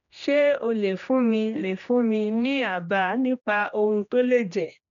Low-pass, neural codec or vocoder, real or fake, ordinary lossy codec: 7.2 kHz; codec, 16 kHz, 1 kbps, X-Codec, HuBERT features, trained on general audio; fake; none